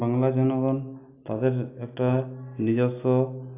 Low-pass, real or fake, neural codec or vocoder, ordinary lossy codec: 3.6 kHz; real; none; AAC, 24 kbps